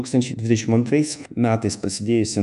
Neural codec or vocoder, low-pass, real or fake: codec, 24 kHz, 1.2 kbps, DualCodec; 10.8 kHz; fake